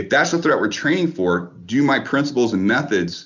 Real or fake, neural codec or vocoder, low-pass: real; none; 7.2 kHz